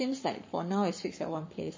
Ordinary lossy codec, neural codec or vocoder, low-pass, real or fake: MP3, 32 kbps; codec, 44.1 kHz, 7.8 kbps, Pupu-Codec; 7.2 kHz; fake